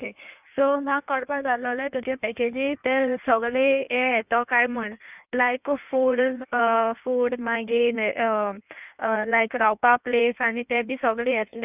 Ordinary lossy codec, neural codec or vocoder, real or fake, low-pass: none; codec, 16 kHz in and 24 kHz out, 1.1 kbps, FireRedTTS-2 codec; fake; 3.6 kHz